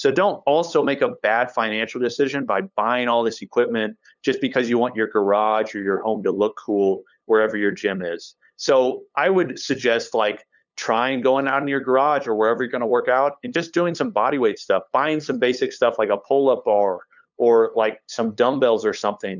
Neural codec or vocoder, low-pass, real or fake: codec, 16 kHz, 8 kbps, FunCodec, trained on LibriTTS, 25 frames a second; 7.2 kHz; fake